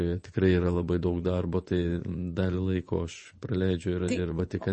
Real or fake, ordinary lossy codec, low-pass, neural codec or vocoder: fake; MP3, 32 kbps; 10.8 kHz; vocoder, 24 kHz, 100 mel bands, Vocos